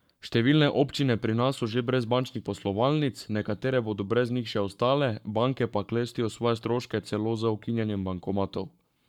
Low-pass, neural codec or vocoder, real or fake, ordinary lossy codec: 19.8 kHz; codec, 44.1 kHz, 7.8 kbps, Pupu-Codec; fake; none